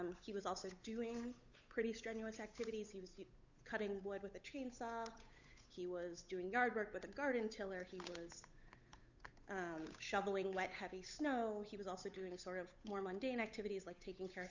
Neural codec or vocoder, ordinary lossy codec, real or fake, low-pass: codec, 16 kHz, 8 kbps, FunCodec, trained on Chinese and English, 25 frames a second; Opus, 64 kbps; fake; 7.2 kHz